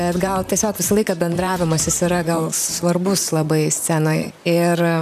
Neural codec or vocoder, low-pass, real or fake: vocoder, 44.1 kHz, 128 mel bands, Pupu-Vocoder; 14.4 kHz; fake